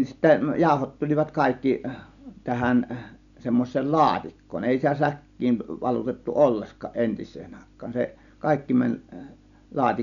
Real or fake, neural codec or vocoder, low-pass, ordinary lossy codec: real; none; 7.2 kHz; MP3, 96 kbps